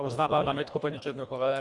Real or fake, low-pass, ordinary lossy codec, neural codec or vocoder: fake; 10.8 kHz; Opus, 64 kbps; codec, 24 kHz, 1.5 kbps, HILCodec